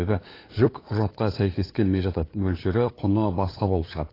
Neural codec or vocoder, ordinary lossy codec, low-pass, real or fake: codec, 16 kHz, 8 kbps, FunCodec, trained on LibriTTS, 25 frames a second; AAC, 24 kbps; 5.4 kHz; fake